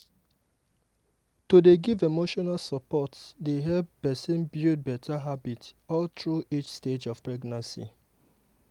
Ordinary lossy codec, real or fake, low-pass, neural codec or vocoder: Opus, 32 kbps; real; 19.8 kHz; none